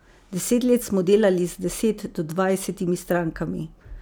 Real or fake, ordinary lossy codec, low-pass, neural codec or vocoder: real; none; none; none